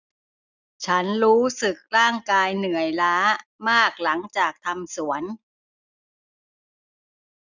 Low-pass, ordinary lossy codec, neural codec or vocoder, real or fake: 7.2 kHz; none; none; real